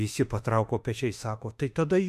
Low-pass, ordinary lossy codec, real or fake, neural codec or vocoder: 14.4 kHz; MP3, 96 kbps; fake; autoencoder, 48 kHz, 32 numbers a frame, DAC-VAE, trained on Japanese speech